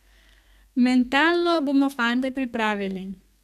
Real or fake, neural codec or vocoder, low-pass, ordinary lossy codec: fake; codec, 32 kHz, 1.9 kbps, SNAC; 14.4 kHz; none